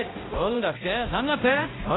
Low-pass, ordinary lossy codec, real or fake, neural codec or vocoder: 7.2 kHz; AAC, 16 kbps; fake; codec, 16 kHz, 0.5 kbps, X-Codec, HuBERT features, trained on balanced general audio